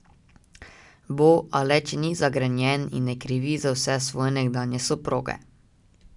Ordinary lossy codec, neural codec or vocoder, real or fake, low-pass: none; none; real; 10.8 kHz